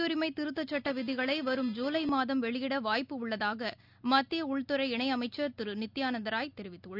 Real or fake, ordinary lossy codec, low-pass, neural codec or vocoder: real; none; 5.4 kHz; none